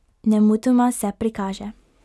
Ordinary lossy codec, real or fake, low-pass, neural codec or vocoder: none; real; none; none